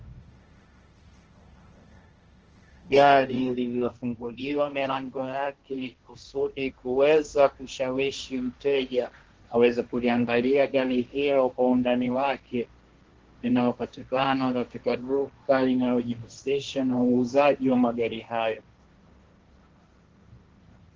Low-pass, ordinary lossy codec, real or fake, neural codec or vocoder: 7.2 kHz; Opus, 16 kbps; fake; codec, 16 kHz, 1.1 kbps, Voila-Tokenizer